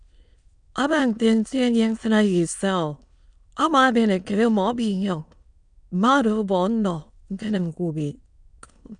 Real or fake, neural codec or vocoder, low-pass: fake; autoencoder, 22.05 kHz, a latent of 192 numbers a frame, VITS, trained on many speakers; 9.9 kHz